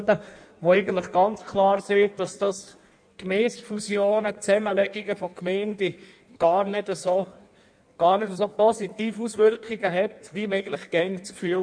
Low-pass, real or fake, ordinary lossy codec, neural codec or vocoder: 9.9 kHz; fake; none; codec, 16 kHz in and 24 kHz out, 1.1 kbps, FireRedTTS-2 codec